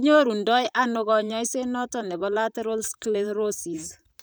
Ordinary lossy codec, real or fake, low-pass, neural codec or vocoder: none; fake; none; vocoder, 44.1 kHz, 128 mel bands, Pupu-Vocoder